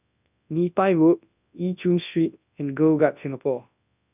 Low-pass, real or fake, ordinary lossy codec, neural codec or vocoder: 3.6 kHz; fake; none; codec, 24 kHz, 0.9 kbps, WavTokenizer, large speech release